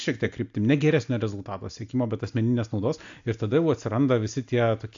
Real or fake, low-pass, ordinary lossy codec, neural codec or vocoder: real; 7.2 kHz; AAC, 64 kbps; none